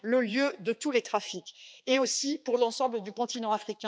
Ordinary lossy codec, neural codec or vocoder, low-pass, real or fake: none; codec, 16 kHz, 2 kbps, X-Codec, HuBERT features, trained on balanced general audio; none; fake